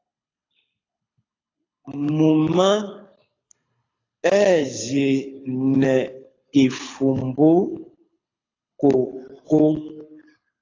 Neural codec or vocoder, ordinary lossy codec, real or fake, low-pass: codec, 24 kHz, 6 kbps, HILCodec; AAC, 32 kbps; fake; 7.2 kHz